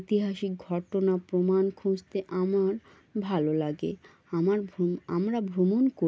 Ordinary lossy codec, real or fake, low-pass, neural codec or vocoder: none; real; none; none